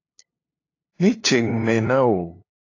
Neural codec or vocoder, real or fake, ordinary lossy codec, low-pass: codec, 16 kHz, 2 kbps, FunCodec, trained on LibriTTS, 25 frames a second; fake; AAC, 32 kbps; 7.2 kHz